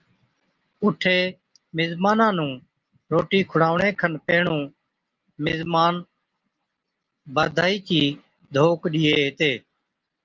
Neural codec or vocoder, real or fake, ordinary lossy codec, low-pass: none; real; Opus, 32 kbps; 7.2 kHz